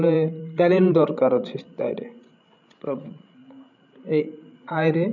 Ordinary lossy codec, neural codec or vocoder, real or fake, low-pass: none; codec, 16 kHz, 16 kbps, FreqCodec, larger model; fake; 7.2 kHz